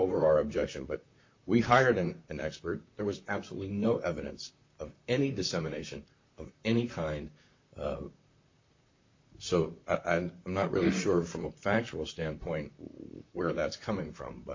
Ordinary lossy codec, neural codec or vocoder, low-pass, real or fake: MP3, 48 kbps; vocoder, 44.1 kHz, 128 mel bands, Pupu-Vocoder; 7.2 kHz; fake